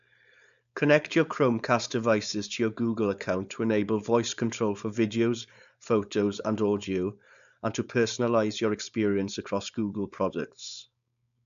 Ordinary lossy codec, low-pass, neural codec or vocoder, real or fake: AAC, 96 kbps; 7.2 kHz; codec, 16 kHz, 4.8 kbps, FACodec; fake